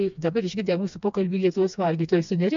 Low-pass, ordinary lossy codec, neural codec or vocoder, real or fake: 7.2 kHz; MP3, 64 kbps; codec, 16 kHz, 2 kbps, FreqCodec, smaller model; fake